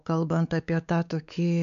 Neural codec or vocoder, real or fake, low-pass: codec, 16 kHz, 4 kbps, X-Codec, WavLM features, trained on Multilingual LibriSpeech; fake; 7.2 kHz